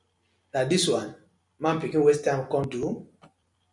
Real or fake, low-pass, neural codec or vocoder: real; 10.8 kHz; none